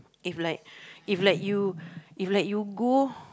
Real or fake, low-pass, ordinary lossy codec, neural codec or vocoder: real; none; none; none